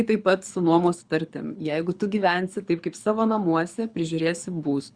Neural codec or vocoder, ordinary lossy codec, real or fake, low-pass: codec, 24 kHz, 6 kbps, HILCodec; Opus, 64 kbps; fake; 9.9 kHz